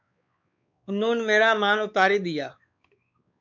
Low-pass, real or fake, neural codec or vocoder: 7.2 kHz; fake; codec, 16 kHz, 4 kbps, X-Codec, WavLM features, trained on Multilingual LibriSpeech